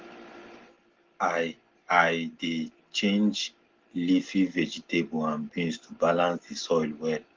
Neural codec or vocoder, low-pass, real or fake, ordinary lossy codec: none; 7.2 kHz; real; Opus, 16 kbps